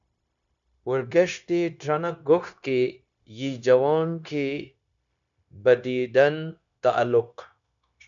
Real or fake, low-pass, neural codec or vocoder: fake; 7.2 kHz; codec, 16 kHz, 0.9 kbps, LongCat-Audio-Codec